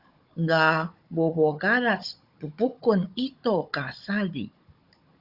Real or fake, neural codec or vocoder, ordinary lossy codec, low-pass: fake; codec, 16 kHz, 16 kbps, FunCodec, trained on Chinese and English, 50 frames a second; Opus, 64 kbps; 5.4 kHz